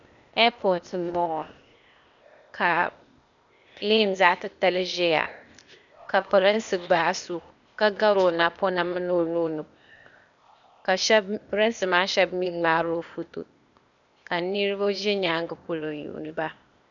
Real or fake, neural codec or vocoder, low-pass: fake; codec, 16 kHz, 0.8 kbps, ZipCodec; 7.2 kHz